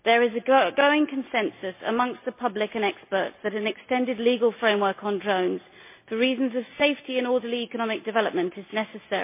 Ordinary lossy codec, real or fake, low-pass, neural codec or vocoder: MP3, 24 kbps; real; 3.6 kHz; none